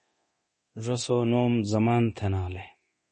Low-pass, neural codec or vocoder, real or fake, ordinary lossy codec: 10.8 kHz; codec, 24 kHz, 0.9 kbps, DualCodec; fake; MP3, 32 kbps